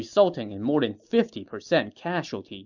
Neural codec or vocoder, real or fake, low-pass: none; real; 7.2 kHz